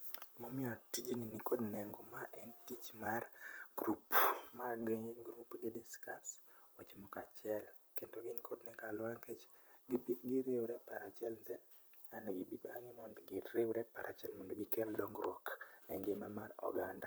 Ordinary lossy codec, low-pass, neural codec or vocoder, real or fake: none; none; vocoder, 44.1 kHz, 128 mel bands, Pupu-Vocoder; fake